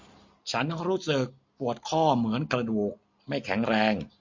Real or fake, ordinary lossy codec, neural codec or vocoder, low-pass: real; MP3, 48 kbps; none; 7.2 kHz